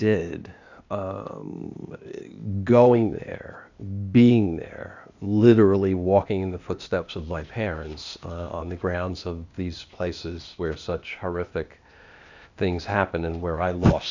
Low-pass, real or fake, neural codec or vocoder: 7.2 kHz; fake; codec, 16 kHz, 0.8 kbps, ZipCodec